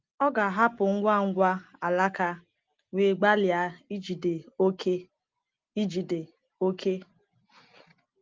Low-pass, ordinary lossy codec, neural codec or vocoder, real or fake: 7.2 kHz; Opus, 32 kbps; none; real